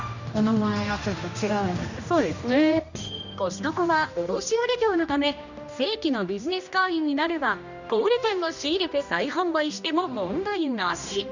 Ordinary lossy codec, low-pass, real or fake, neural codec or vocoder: none; 7.2 kHz; fake; codec, 16 kHz, 1 kbps, X-Codec, HuBERT features, trained on general audio